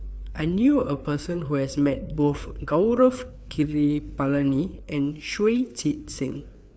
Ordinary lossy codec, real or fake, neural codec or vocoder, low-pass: none; fake; codec, 16 kHz, 4 kbps, FreqCodec, larger model; none